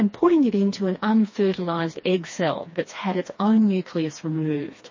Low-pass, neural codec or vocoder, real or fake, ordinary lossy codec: 7.2 kHz; codec, 16 kHz, 2 kbps, FreqCodec, smaller model; fake; MP3, 32 kbps